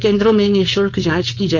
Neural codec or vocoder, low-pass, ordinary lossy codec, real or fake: codec, 16 kHz, 4.8 kbps, FACodec; 7.2 kHz; none; fake